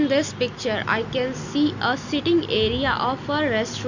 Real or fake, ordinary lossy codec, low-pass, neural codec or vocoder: real; none; 7.2 kHz; none